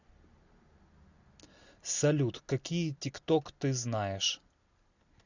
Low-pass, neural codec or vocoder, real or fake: 7.2 kHz; none; real